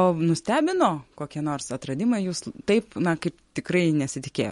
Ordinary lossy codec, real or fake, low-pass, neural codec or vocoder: MP3, 48 kbps; real; 19.8 kHz; none